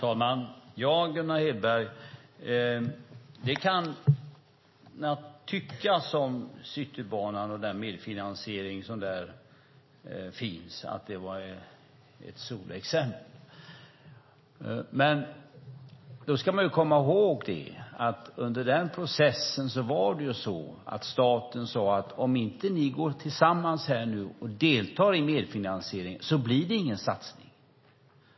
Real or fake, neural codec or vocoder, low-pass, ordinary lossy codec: real; none; 7.2 kHz; MP3, 24 kbps